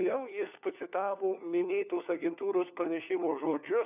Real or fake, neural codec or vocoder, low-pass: fake; codec, 16 kHz, 4 kbps, FunCodec, trained on LibriTTS, 50 frames a second; 3.6 kHz